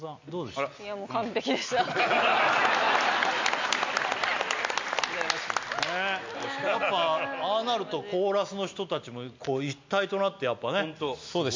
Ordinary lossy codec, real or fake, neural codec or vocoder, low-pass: MP3, 64 kbps; real; none; 7.2 kHz